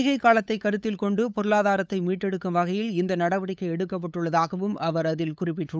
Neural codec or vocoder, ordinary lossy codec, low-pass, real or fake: codec, 16 kHz, 8 kbps, FunCodec, trained on LibriTTS, 25 frames a second; none; none; fake